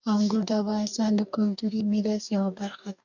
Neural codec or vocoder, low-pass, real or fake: codec, 44.1 kHz, 2.6 kbps, DAC; 7.2 kHz; fake